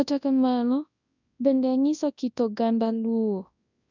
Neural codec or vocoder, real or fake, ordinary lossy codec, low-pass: codec, 24 kHz, 0.9 kbps, WavTokenizer, large speech release; fake; none; 7.2 kHz